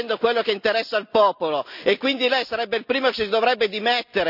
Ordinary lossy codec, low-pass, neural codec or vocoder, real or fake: none; 5.4 kHz; none; real